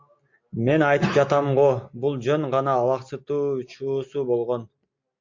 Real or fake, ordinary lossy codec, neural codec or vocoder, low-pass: real; MP3, 48 kbps; none; 7.2 kHz